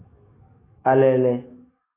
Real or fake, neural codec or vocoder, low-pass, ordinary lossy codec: fake; autoencoder, 48 kHz, 128 numbers a frame, DAC-VAE, trained on Japanese speech; 3.6 kHz; AAC, 16 kbps